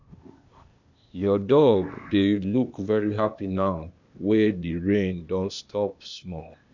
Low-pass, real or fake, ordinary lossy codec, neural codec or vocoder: 7.2 kHz; fake; none; codec, 16 kHz, 0.8 kbps, ZipCodec